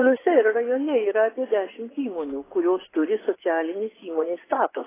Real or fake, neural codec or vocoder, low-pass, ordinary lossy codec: fake; vocoder, 24 kHz, 100 mel bands, Vocos; 3.6 kHz; AAC, 16 kbps